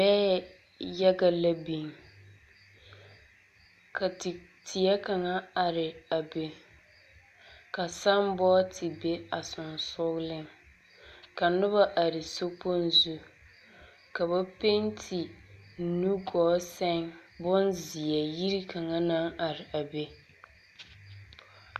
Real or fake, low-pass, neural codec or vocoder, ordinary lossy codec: real; 14.4 kHz; none; AAC, 96 kbps